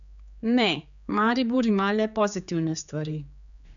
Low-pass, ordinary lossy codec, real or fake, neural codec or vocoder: 7.2 kHz; none; fake; codec, 16 kHz, 4 kbps, X-Codec, HuBERT features, trained on general audio